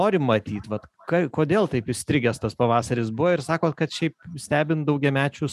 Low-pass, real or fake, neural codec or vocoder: 14.4 kHz; real; none